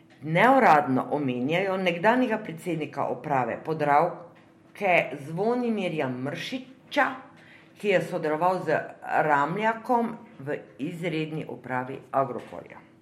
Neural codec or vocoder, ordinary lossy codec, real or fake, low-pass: none; MP3, 64 kbps; real; 19.8 kHz